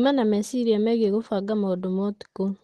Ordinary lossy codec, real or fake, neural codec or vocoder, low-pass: Opus, 16 kbps; real; none; 10.8 kHz